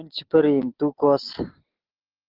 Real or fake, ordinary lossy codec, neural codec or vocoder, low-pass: real; Opus, 16 kbps; none; 5.4 kHz